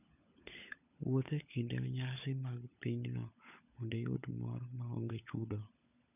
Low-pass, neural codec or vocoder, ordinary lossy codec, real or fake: 3.6 kHz; codec, 16 kHz, 16 kbps, FunCodec, trained on LibriTTS, 50 frames a second; none; fake